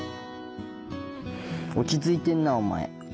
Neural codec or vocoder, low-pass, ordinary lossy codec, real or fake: none; none; none; real